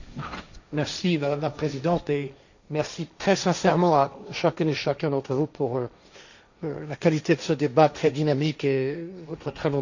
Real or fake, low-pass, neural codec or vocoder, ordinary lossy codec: fake; 7.2 kHz; codec, 16 kHz, 1.1 kbps, Voila-Tokenizer; none